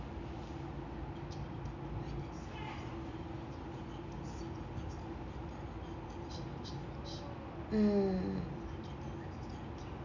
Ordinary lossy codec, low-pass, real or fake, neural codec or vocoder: none; 7.2 kHz; real; none